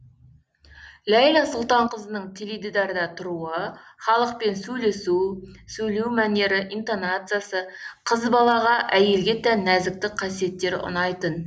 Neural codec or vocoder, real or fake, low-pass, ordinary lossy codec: none; real; none; none